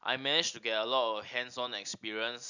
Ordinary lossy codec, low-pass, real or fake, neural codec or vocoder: none; 7.2 kHz; real; none